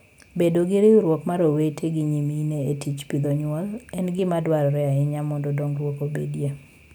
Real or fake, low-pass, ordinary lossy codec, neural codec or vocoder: real; none; none; none